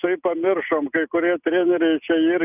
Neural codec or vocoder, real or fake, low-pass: none; real; 3.6 kHz